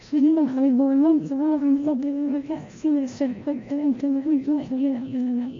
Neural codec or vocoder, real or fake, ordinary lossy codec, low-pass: codec, 16 kHz, 0.5 kbps, FreqCodec, larger model; fake; MP3, 48 kbps; 7.2 kHz